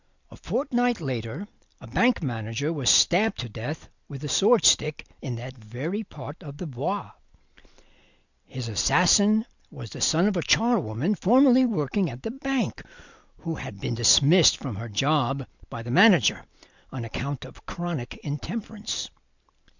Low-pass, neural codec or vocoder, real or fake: 7.2 kHz; none; real